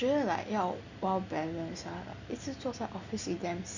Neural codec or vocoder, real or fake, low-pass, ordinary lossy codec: none; real; 7.2 kHz; Opus, 64 kbps